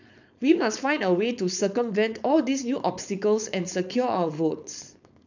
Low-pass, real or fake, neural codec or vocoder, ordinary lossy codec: 7.2 kHz; fake; codec, 16 kHz, 4.8 kbps, FACodec; none